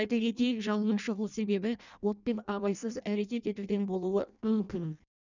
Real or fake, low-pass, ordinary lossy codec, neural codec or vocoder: fake; 7.2 kHz; none; codec, 16 kHz in and 24 kHz out, 0.6 kbps, FireRedTTS-2 codec